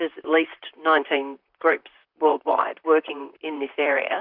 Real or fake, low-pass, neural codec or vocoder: fake; 5.4 kHz; vocoder, 44.1 kHz, 128 mel bands, Pupu-Vocoder